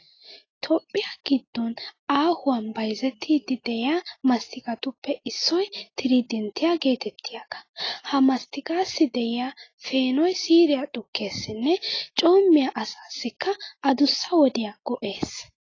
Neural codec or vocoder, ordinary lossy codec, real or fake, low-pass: none; AAC, 32 kbps; real; 7.2 kHz